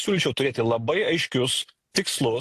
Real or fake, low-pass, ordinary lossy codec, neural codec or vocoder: real; 14.4 kHz; AAC, 64 kbps; none